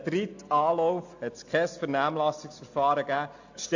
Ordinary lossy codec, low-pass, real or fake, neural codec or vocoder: AAC, 48 kbps; 7.2 kHz; real; none